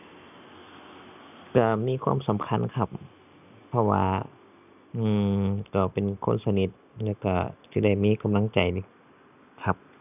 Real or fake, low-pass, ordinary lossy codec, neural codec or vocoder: real; 3.6 kHz; none; none